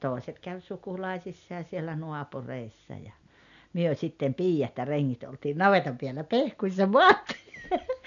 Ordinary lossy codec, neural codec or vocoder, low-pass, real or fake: none; none; 7.2 kHz; real